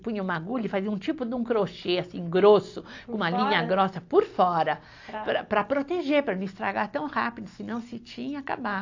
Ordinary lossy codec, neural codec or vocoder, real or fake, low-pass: AAC, 48 kbps; none; real; 7.2 kHz